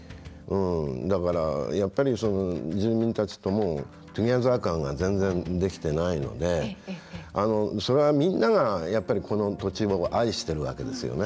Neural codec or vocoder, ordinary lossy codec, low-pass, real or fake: none; none; none; real